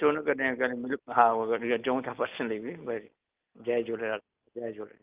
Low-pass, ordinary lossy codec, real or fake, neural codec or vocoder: 3.6 kHz; Opus, 24 kbps; real; none